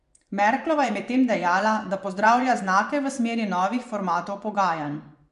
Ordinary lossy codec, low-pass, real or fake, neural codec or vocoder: none; 10.8 kHz; fake; vocoder, 24 kHz, 100 mel bands, Vocos